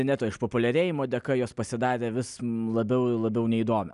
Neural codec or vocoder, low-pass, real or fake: none; 10.8 kHz; real